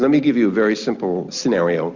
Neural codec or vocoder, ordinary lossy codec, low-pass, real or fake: none; Opus, 64 kbps; 7.2 kHz; real